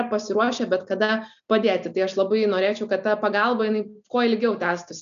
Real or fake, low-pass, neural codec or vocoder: real; 7.2 kHz; none